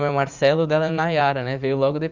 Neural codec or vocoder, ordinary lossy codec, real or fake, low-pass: vocoder, 44.1 kHz, 80 mel bands, Vocos; none; fake; 7.2 kHz